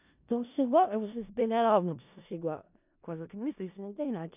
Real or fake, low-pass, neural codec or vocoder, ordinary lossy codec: fake; 3.6 kHz; codec, 16 kHz in and 24 kHz out, 0.4 kbps, LongCat-Audio-Codec, four codebook decoder; none